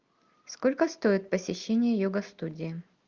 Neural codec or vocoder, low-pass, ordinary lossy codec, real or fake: none; 7.2 kHz; Opus, 32 kbps; real